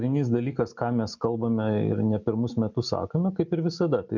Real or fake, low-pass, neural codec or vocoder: real; 7.2 kHz; none